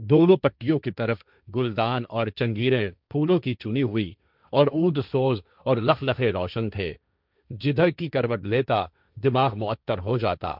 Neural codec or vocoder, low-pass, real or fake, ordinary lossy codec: codec, 16 kHz, 1.1 kbps, Voila-Tokenizer; 5.4 kHz; fake; none